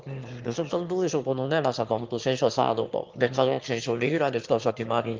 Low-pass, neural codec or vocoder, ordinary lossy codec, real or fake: 7.2 kHz; autoencoder, 22.05 kHz, a latent of 192 numbers a frame, VITS, trained on one speaker; Opus, 32 kbps; fake